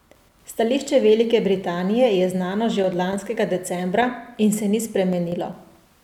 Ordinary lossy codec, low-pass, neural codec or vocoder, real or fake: none; 19.8 kHz; none; real